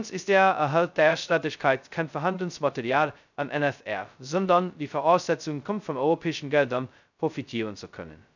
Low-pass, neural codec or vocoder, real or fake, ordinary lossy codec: 7.2 kHz; codec, 16 kHz, 0.2 kbps, FocalCodec; fake; none